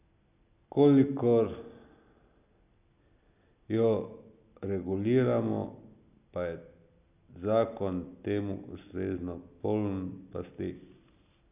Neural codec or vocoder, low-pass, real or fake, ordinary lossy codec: none; 3.6 kHz; real; none